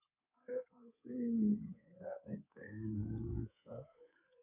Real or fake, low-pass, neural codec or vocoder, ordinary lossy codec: fake; 3.6 kHz; codec, 24 kHz, 3.1 kbps, DualCodec; none